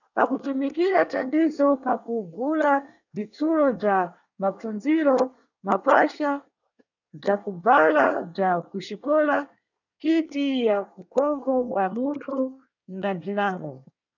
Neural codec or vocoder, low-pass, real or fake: codec, 24 kHz, 1 kbps, SNAC; 7.2 kHz; fake